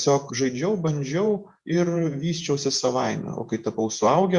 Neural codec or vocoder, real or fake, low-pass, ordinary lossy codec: vocoder, 48 kHz, 128 mel bands, Vocos; fake; 10.8 kHz; Opus, 64 kbps